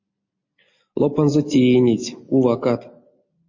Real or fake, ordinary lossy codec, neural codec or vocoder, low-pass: real; MP3, 32 kbps; none; 7.2 kHz